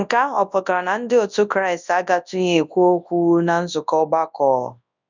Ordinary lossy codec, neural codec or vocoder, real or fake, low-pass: none; codec, 24 kHz, 0.9 kbps, WavTokenizer, large speech release; fake; 7.2 kHz